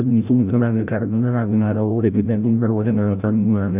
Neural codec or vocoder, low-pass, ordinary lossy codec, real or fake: codec, 16 kHz, 0.5 kbps, FreqCodec, larger model; 3.6 kHz; AAC, 32 kbps; fake